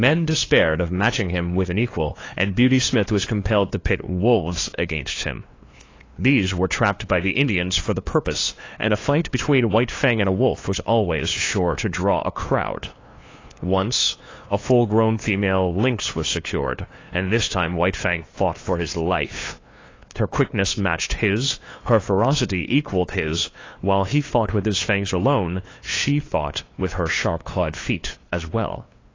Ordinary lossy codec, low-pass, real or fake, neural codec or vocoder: AAC, 32 kbps; 7.2 kHz; fake; codec, 16 kHz, 2 kbps, FunCodec, trained on LibriTTS, 25 frames a second